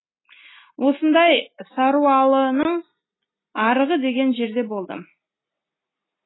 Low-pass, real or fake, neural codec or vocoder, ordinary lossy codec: 7.2 kHz; real; none; AAC, 16 kbps